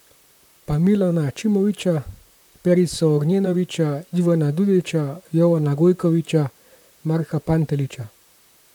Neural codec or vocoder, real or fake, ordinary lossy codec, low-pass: vocoder, 44.1 kHz, 128 mel bands, Pupu-Vocoder; fake; none; 19.8 kHz